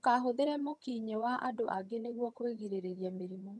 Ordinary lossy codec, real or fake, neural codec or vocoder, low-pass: none; fake; vocoder, 22.05 kHz, 80 mel bands, HiFi-GAN; none